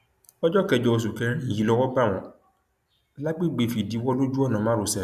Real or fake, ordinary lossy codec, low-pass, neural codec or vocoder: real; none; 14.4 kHz; none